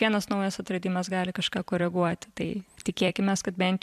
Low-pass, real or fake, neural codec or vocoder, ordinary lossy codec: 14.4 kHz; fake; vocoder, 44.1 kHz, 128 mel bands every 256 samples, BigVGAN v2; MP3, 96 kbps